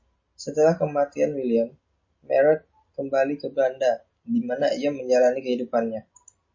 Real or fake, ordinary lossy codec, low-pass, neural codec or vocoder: real; MP3, 32 kbps; 7.2 kHz; none